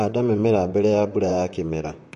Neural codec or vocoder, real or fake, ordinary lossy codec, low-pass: vocoder, 44.1 kHz, 128 mel bands, Pupu-Vocoder; fake; MP3, 48 kbps; 14.4 kHz